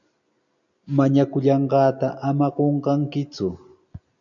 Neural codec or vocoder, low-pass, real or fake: none; 7.2 kHz; real